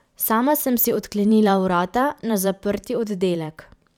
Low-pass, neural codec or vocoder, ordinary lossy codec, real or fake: 19.8 kHz; vocoder, 44.1 kHz, 128 mel bands every 512 samples, BigVGAN v2; none; fake